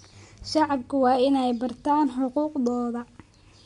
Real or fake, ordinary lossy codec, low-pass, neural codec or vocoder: real; MP3, 64 kbps; 10.8 kHz; none